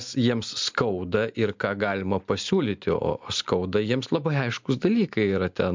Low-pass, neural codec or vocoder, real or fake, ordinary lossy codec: 7.2 kHz; none; real; MP3, 64 kbps